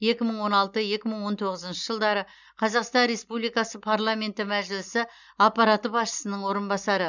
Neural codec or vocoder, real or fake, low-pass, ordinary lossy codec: none; real; 7.2 kHz; none